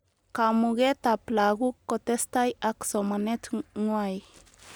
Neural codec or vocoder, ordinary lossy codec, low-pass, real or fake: none; none; none; real